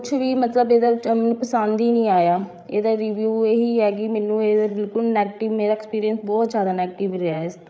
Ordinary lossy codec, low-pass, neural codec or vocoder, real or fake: none; none; codec, 16 kHz, 8 kbps, FreqCodec, larger model; fake